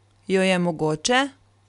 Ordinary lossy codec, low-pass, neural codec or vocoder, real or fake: MP3, 96 kbps; 10.8 kHz; none; real